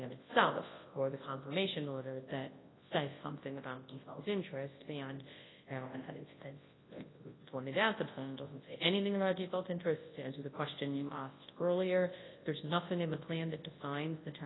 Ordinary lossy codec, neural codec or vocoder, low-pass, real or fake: AAC, 16 kbps; codec, 24 kHz, 0.9 kbps, WavTokenizer, large speech release; 7.2 kHz; fake